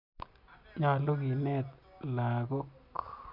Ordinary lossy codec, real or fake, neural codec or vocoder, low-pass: MP3, 48 kbps; real; none; 5.4 kHz